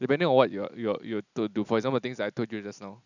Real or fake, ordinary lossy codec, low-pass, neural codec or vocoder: real; none; 7.2 kHz; none